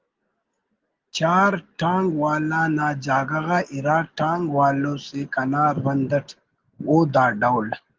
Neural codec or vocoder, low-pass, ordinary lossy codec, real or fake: none; 7.2 kHz; Opus, 16 kbps; real